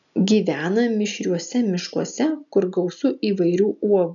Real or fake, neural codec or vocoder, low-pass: real; none; 7.2 kHz